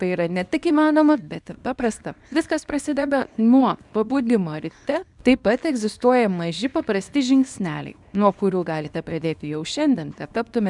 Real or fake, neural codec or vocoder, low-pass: fake; codec, 24 kHz, 0.9 kbps, WavTokenizer, small release; 10.8 kHz